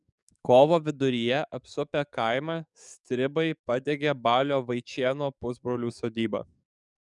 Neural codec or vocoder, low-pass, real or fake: codec, 44.1 kHz, 7.8 kbps, DAC; 10.8 kHz; fake